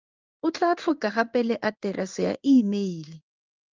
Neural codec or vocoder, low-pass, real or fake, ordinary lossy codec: codec, 16 kHz in and 24 kHz out, 1 kbps, XY-Tokenizer; 7.2 kHz; fake; Opus, 24 kbps